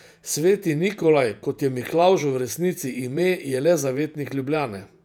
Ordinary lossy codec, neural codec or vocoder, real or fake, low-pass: none; vocoder, 48 kHz, 128 mel bands, Vocos; fake; 19.8 kHz